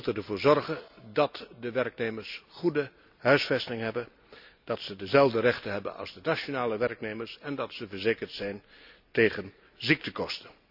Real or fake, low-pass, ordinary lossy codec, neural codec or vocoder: real; 5.4 kHz; none; none